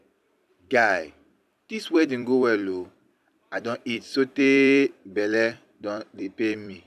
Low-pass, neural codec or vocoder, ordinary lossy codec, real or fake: 14.4 kHz; vocoder, 44.1 kHz, 128 mel bands every 256 samples, BigVGAN v2; none; fake